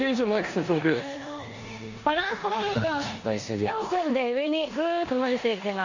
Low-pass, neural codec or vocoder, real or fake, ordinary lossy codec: 7.2 kHz; codec, 16 kHz in and 24 kHz out, 0.9 kbps, LongCat-Audio-Codec, four codebook decoder; fake; Opus, 64 kbps